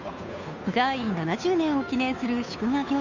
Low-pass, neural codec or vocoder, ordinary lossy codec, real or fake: 7.2 kHz; codec, 16 kHz, 2 kbps, FunCodec, trained on Chinese and English, 25 frames a second; none; fake